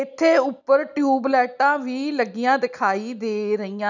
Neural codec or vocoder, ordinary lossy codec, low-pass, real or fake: none; none; 7.2 kHz; real